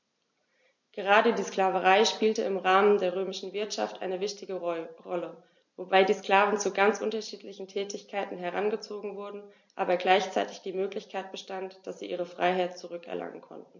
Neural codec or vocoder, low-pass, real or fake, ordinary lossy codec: none; 7.2 kHz; real; MP3, 48 kbps